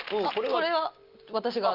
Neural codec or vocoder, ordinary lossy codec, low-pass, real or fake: none; Opus, 24 kbps; 5.4 kHz; real